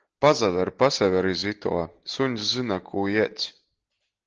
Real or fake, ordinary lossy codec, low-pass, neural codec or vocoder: real; Opus, 16 kbps; 7.2 kHz; none